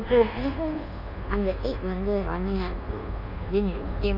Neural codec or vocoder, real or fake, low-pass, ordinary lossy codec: codec, 24 kHz, 1.2 kbps, DualCodec; fake; 5.4 kHz; none